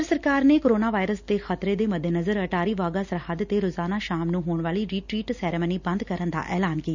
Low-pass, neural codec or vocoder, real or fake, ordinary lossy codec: 7.2 kHz; none; real; none